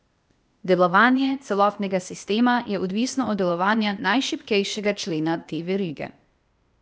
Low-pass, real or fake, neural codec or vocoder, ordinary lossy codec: none; fake; codec, 16 kHz, 0.8 kbps, ZipCodec; none